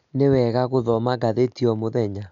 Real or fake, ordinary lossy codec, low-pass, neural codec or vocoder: real; MP3, 96 kbps; 7.2 kHz; none